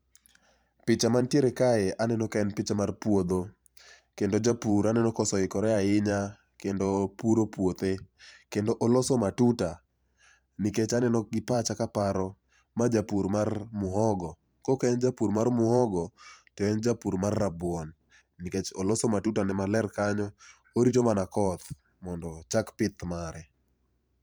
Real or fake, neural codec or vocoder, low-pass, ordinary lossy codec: fake; vocoder, 44.1 kHz, 128 mel bands every 512 samples, BigVGAN v2; none; none